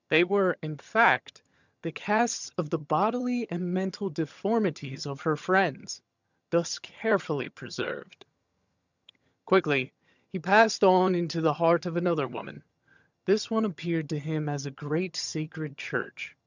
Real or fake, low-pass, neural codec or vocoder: fake; 7.2 kHz; vocoder, 22.05 kHz, 80 mel bands, HiFi-GAN